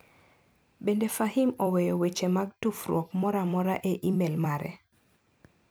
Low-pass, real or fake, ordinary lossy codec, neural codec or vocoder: none; fake; none; vocoder, 44.1 kHz, 128 mel bands every 256 samples, BigVGAN v2